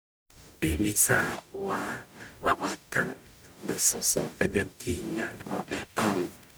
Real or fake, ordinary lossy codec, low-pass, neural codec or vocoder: fake; none; none; codec, 44.1 kHz, 0.9 kbps, DAC